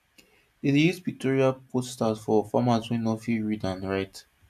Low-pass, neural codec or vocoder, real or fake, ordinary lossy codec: 14.4 kHz; none; real; MP3, 96 kbps